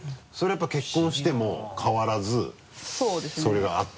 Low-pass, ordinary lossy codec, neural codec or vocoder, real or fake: none; none; none; real